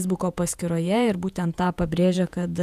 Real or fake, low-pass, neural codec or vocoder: fake; 14.4 kHz; vocoder, 48 kHz, 128 mel bands, Vocos